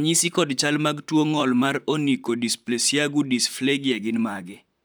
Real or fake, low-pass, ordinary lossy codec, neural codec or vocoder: fake; none; none; vocoder, 44.1 kHz, 128 mel bands, Pupu-Vocoder